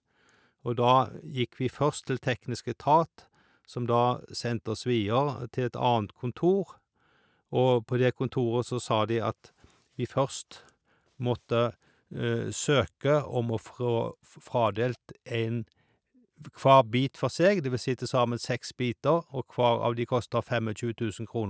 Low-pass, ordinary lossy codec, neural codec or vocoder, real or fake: none; none; none; real